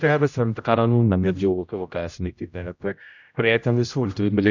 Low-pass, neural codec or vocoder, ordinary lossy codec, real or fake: 7.2 kHz; codec, 16 kHz, 0.5 kbps, X-Codec, HuBERT features, trained on general audio; none; fake